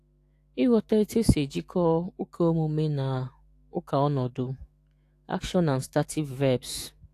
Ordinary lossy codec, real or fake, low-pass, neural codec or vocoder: AAC, 64 kbps; fake; 14.4 kHz; autoencoder, 48 kHz, 128 numbers a frame, DAC-VAE, trained on Japanese speech